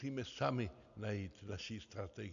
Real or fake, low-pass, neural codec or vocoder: real; 7.2 kHz; none